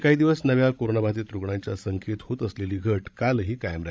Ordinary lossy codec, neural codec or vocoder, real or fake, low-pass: none; codec, 16 kHz, 16 kbps, FreqCodec, larger model; fake; none